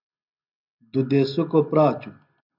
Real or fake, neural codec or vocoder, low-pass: real; none; 5.4 kHz